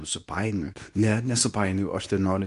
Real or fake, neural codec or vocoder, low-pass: fake; codec, 24 kHz, 0.9 kbps, WavTokenizer, medium speech release version 2; 10.8 kHz